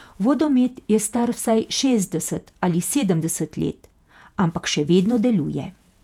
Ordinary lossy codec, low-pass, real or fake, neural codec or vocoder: none; 19.8 kHz; fake; vocoder, 48 kHz, 128 mel bands, Vocos